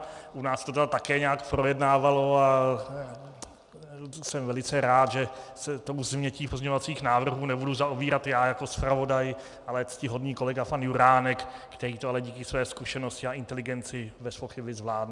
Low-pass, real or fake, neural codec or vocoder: 10.8 kHz; real; none